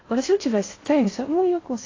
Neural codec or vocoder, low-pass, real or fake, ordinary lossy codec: codec, 16 kHz in and 24 kHz out, 0.6 kbps, FocalCodec, streaming, 2048 codes; 7.2 kHz; fake; AAC, 32 kbps